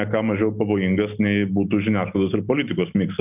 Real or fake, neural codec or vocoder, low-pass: real; none; 3.6 kHz